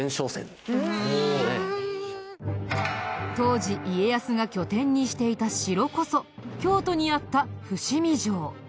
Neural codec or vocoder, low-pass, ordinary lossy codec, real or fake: none; none; none; real